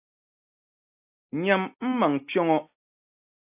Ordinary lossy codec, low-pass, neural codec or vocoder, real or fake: AAC, 24 kbps; 3.6 kHz; none; real